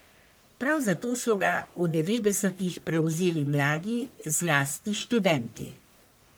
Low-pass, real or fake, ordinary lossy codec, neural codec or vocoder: none; fake; none; codec, 44.1 kHz, 1.7 kbps, Pupu-Codec